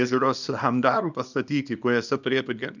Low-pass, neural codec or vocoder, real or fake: 7.2 kHz; codec, 24 kHz, 0.9 kbps, WavTokenizer, small release; fake